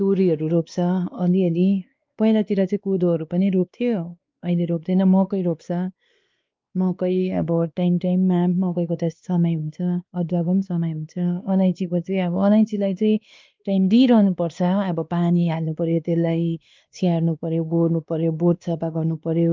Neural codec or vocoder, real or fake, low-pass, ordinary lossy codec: codec, 16 kHz, 2 kbps, X-Codec, WavLM features, trained on Multilingual LibriSpeech; fake; 7.2 kHz; Opus, 24 kbps